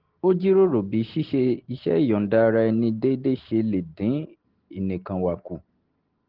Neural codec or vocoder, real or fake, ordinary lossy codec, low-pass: none; real; Opus, 16 kbps; 5.4 kHz